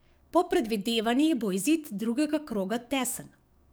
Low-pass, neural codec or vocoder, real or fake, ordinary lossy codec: none; codec, 44.1 kHz, 7.8 kbps, DAC; fake; none